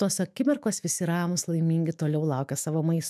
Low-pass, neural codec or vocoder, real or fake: 14.4 kHz; autoencoder, 48 kHz, 128 numbers a frame, DAC-VAE, trained on Japanese speech; fake